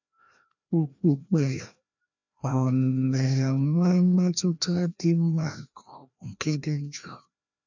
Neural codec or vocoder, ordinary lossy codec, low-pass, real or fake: codec, 16 kHz, 1 kbps, FreqCodec, larger model; none; 7.2 kHz; fake